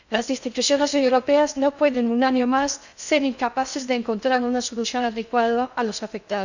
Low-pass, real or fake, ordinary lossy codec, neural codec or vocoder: 7.2 kHz; fake; none; codec, 16 kHz in and 24 kHz out, 0.6 kbps, FocalCodec, streaming, 2048 codes